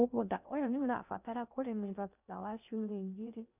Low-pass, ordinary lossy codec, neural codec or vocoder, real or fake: 3.6 kHz; none; codec, 16 kHz in and 24 kHz out, 0.6 kbps, FocalCodec, streaming, 2048 codes; fake